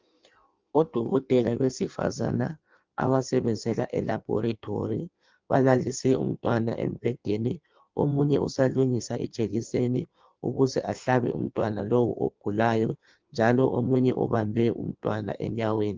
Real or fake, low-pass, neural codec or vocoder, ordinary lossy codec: fake; 7.2 kHz; codec, 16 kHz in and 24 kHz out, 1.1 kbps, FireRedTTS-2 codec; Opus, 32 kbps